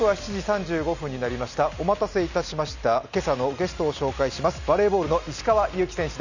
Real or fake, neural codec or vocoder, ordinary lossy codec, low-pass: real; none; none; 7.2 kHz